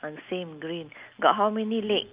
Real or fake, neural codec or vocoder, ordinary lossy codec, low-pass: real; none; Opus, 24 kbps; 3.6 kHz